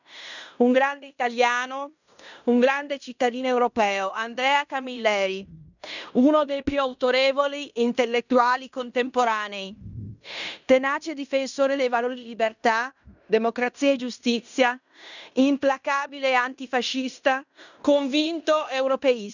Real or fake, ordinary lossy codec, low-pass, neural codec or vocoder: fake; none; 7.2 kHz; codec, 16 kHz in and 24 kHz out, 0.9 kbps, LongCat-Audio-Codec, fine tuned four codebook decoder